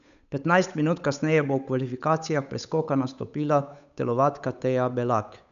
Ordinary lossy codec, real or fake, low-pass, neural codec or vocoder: MP3, 96 kbps; fake; 7.2 kHz; codec, 16 kHz, 8 kbps, FunCodec, trained on Chinese and English, 25 frames a second